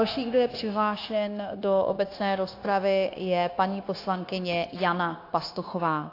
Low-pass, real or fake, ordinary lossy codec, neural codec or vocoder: 5.4 kHz; fake; AAC, 32 kbps; codec, 16 kHz, 0.9 kbps, LongCat-Audio-Codec